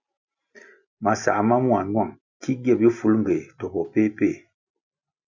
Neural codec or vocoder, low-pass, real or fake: none; 7.2 kHz; real